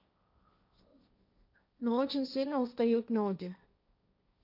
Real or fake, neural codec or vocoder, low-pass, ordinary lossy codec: fake; codec, 16 kHz, 1.1 kbps, Voila-Tokenizer; 5.4 kHz; none